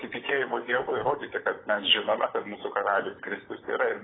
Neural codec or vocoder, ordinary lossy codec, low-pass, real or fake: codec, 16 kHz, 16 kbps, FunCodec, trained on LibriTTS, 50 frames a second; AAC, 16 kbps; 7.2 kHz; fake